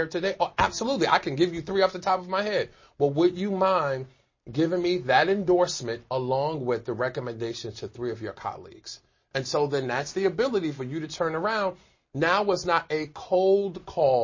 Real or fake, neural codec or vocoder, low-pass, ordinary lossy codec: real; none; 7.2 kHz; MP3, 32 kbps